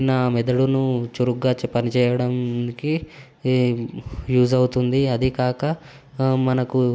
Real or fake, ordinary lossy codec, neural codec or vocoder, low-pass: real; none; none; none